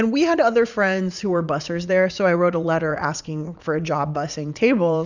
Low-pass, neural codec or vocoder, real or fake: 7.2 kHz; vocoder, 44.1 kHz, 80 mel bands, Vocos; fake